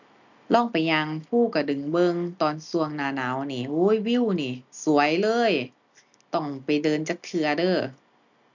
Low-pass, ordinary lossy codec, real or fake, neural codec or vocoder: 7.2 kHz; none; real; none